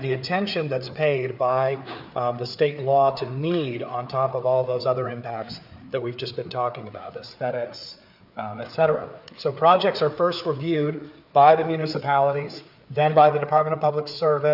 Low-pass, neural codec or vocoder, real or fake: 5.4 kHz; codec, 16 kHz, 4 kbps, FreqCodec, larger model; fake